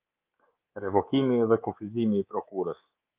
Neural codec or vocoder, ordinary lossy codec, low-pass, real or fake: codec, 24 kHz, 3.1 kbps, DualCodec; Opus, 32 kbps; 3.6 kHz; fake